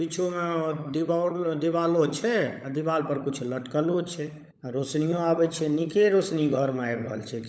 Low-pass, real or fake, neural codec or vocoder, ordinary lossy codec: none; fake; codec, 16 kHz, 16 kbps, FunCodec, trained on LibriTTS, 50 frames a second; none